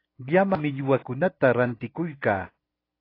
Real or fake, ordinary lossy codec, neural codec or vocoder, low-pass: real; AAC, 24 kbps; none; 5.4 kHz